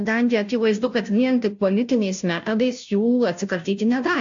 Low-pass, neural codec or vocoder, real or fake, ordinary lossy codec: 7.2 kHz; codec, 16 kHz, 0.5 kbps, FunCodec, trained on Chinese and English, 25 frames a second; fake; AAC, 64 kbps